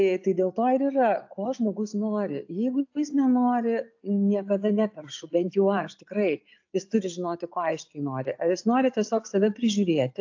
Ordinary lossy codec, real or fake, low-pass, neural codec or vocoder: AAC, 48 kbps; real; 7.2 kHz; none